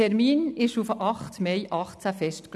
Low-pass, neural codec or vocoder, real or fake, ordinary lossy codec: none; none; real; none